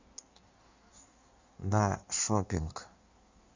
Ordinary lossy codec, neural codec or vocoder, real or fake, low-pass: Opus, 64 kbps; codec, 16 kHz in and 24 kHz out, 1.1 kbps, FireRedTTS-2 codec; fake; 7.2 kHz